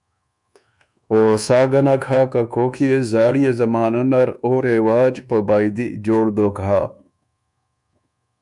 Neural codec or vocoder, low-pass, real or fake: codec, 24 kHz, 1.2 kbps, DualCodec; 10.8 kHz; fake